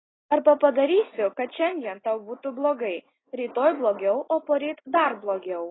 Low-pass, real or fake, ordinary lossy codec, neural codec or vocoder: 7.2 kHz; real; AAC, 16 kbps; none